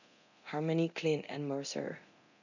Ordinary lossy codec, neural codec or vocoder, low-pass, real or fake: none; codec, 24 kHz, 0.9 kbps, DualCodec; 7.2 kHz; fake